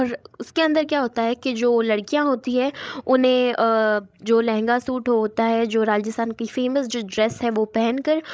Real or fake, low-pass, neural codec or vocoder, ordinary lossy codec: fake; none; codec, 16 kHz, 16 kbps, FreqCodec, larger model; none